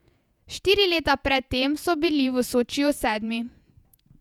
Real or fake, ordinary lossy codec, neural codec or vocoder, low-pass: fake; none; vocoder, 48 kHz, 128 mel bands, Vocos; 19.8 kHz